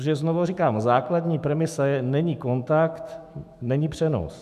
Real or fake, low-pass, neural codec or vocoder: fake; 14.4 kHz; autoencoder, 48 kHz, 128 numbers a frame, DAC-VAE, trained on Japanese speech